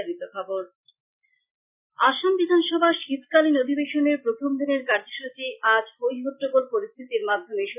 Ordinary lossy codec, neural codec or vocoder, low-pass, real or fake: none; none; 3.6 kHz; real